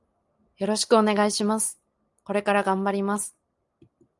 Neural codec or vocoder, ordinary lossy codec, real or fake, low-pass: none; Opus, 32 kbps; real; 10.8 kHz